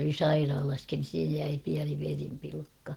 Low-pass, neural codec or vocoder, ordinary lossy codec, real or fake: 19.8 kHz; none; Opus, 16 kbps; real